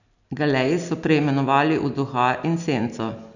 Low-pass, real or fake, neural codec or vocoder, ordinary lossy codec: 7.2 kHz; real; none; none